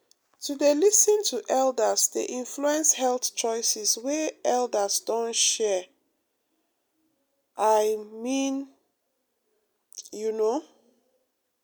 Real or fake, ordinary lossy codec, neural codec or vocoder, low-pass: real; none; none; none